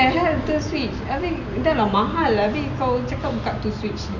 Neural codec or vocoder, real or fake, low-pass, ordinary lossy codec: none; real; 7.2 kHz; none